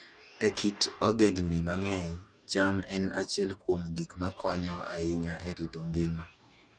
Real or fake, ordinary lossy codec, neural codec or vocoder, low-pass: fake; none; codec, 44.1 kHz, 2.6 kbps, DAC; 9.9 kHz